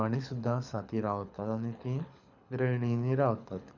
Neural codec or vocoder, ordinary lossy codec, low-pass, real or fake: codec, 24 kHz, 6 kbps, HILCodec; none; 7.2 kHz; fake